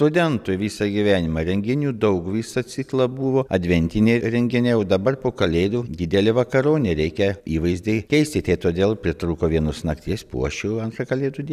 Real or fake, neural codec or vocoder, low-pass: real; none; 14.4 kHz